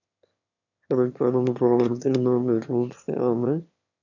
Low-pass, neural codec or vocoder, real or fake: 7.2 kHz; autoencoder, 22.05 kHz, a latent of 192 numbers a frame, VITS, trained on one speaker; fake